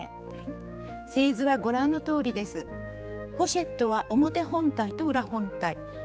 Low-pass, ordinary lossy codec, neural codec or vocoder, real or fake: none; none; codec, 16 kHz, 4 kbps, X-Codec, HuBERT features, trained on general audio; fake